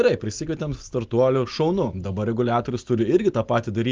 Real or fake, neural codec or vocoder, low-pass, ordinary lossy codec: real; none; 7.2 kHz; Opus, 32 kbps